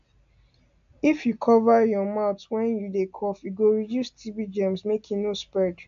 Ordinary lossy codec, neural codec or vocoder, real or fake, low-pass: AAC, 64 kbps; none; real; 7.2 kHz